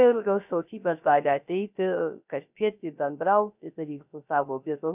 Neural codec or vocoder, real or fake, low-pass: codec, 16 kHz, 0.3 kbps, FocalCodec; fake; 3.6 kHz